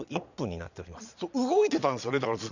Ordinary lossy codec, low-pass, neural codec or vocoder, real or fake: none; 7.2 kHz; vocoder, 22.05 kHz, 80 mel bands, Vocos; fake